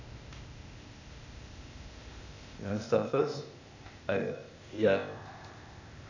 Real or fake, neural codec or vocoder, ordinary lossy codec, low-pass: fake; codec, 16 kHz, 0.8 kbps, ZipCodec; none; 7.2 kHz